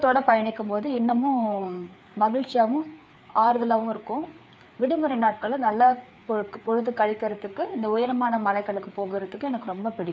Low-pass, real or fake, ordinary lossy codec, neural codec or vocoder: none; fake; none; codec, 16 kHz, 4 kbps, FreqCodec, larger model